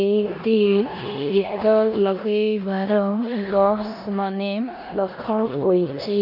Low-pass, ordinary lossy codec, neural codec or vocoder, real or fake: 5.4 kHz; none; codec, 16 kHz in and 24 kHz out, 0.9 kbps, LongCat-Audio-Codec, four codebook decoder; fake